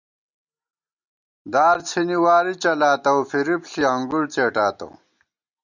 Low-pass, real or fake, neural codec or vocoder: 7.2 kHz; real; none